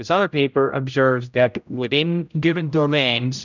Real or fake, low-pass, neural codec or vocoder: fake; 7.2 kHz; codec, 16 kHz, 0.5 kbps, X-Codec, HuBERT features, trained on general audio